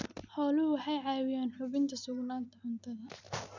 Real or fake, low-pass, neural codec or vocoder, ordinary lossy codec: real; 7.2 kHz; none; none